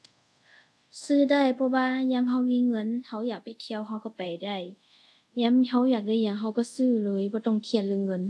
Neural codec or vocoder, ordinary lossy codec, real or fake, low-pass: codec, 24 kHz, 0.5 kbps, DualCodec; none; fake; none